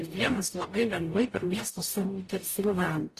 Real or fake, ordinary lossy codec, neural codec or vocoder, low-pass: fake; AAC, 48 kbps; codec, 44.1 kHz, 0.9 kbps, DAC; 14.4 kHz